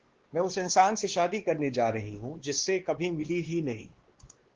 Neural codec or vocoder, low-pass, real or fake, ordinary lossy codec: codec, 16 kHz, 2 kbps, X-Codec, WavLM features, trained on Multilingual LibriSpeech; 7.2 kHz; fake; Opus, 16 kbps